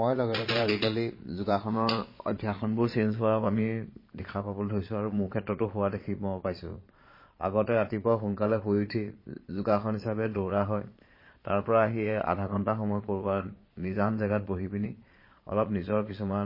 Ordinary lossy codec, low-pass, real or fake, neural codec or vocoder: MP3, 24 kbps; 5.4 kHz; fake; vocoder, 22.05 kHz, 80 mel bands, Vocos